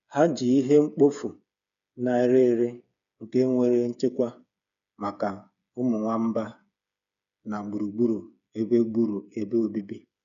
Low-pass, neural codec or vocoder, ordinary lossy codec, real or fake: 7.2 kHz; codec, 16 kHz, 8 kbps, FreqCodec, smaller model; none; fake